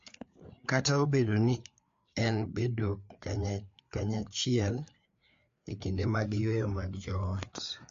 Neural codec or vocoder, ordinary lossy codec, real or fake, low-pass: codec, 16 kHz, 4 kbps, FreqCodec, larger model; AAC, 48 kbps; fake; 7.2 kHz